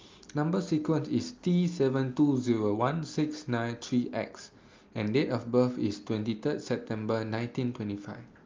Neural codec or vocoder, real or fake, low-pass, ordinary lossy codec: none; real; 7.2 kHz; Opus, 24 kbps